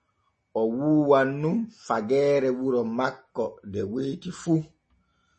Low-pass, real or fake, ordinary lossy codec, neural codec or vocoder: 10.8 kHz; real; MP3, 32 kbps; none